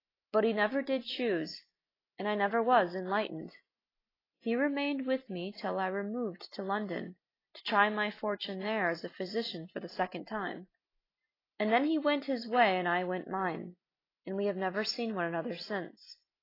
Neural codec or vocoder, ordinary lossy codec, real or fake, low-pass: none; AAC, 24 kbps; real; 5.4 kHz